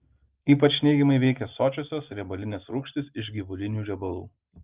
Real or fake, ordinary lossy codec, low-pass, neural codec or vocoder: real; Opus, 24 kbps; 3.6 kHz; none